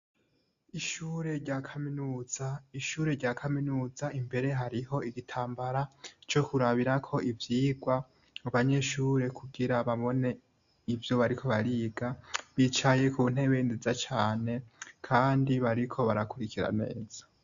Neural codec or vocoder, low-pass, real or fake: none; 7.2 kHz; real